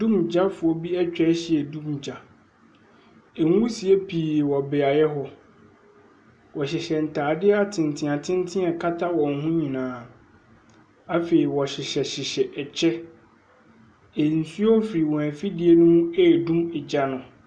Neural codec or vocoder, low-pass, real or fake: none; 9.9 kHz; real